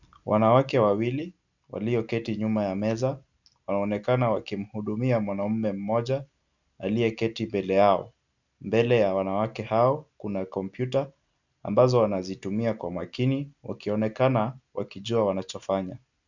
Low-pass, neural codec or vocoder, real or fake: 7.2 kHz; none; real